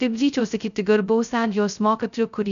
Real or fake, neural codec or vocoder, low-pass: fake; codec, 16 kHz, 0.2 kbps, FocalCodec; 7.2 kHz